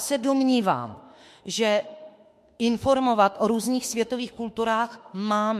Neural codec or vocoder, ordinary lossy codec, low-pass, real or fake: autoencoder, 48 kHz, 32 numbers a frame, DAC-VAE, trained on Japanese speech; MP3, 64 kbps; 14.4 kHz; fake